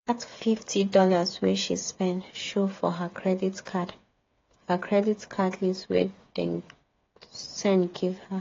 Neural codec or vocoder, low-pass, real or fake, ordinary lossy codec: codec, 16 kHz, 8 kbps, FreqCodec, smaller model; 7.2 kHz; fake; AAC, 32 kbps